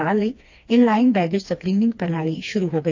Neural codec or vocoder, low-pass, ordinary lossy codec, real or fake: codec, 16 kHz, 2 kbps, FreqCodec, smaller model; 7.2 kHz; AAC, 48 kbps; fake